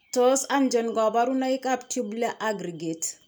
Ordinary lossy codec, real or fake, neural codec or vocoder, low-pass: none; real; none; none